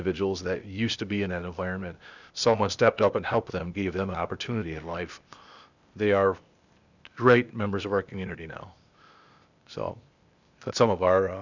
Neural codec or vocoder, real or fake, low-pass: codec, 16 kHz, 0.8 kbps, ZipCodec; fake; 7.2 kHz